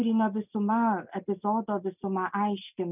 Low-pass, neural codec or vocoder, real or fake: 3.6 kHz; none; real